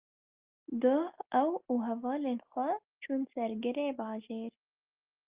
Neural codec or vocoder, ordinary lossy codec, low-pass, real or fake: none; Opus, 24 kbps; 3.6 kHz; real